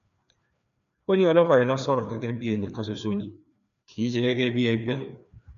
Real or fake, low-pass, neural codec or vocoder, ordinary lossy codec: fake; 7.2 kHz; codec, 16 kHz, 2 kbps, FreqCodec, larger model; none